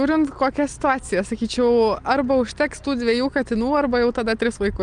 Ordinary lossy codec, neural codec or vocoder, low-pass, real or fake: Opus, 32 kbps; none; 9.9 kHz; real